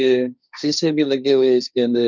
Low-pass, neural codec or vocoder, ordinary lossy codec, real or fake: 7.2 kHz; codec, 16 kHz, 1.1 kbps, Voila-Tokenizer; none; fake